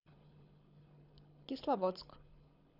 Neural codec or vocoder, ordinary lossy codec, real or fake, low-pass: codec, 24 kHz, 6 kbps, HILCodec; none; fake; 5.4 kHz